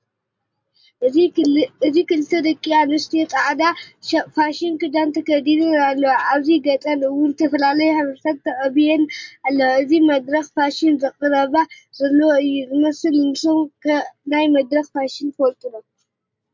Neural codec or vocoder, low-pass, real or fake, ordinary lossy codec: none; 7.2 kHz; real; MP3, 48 kbps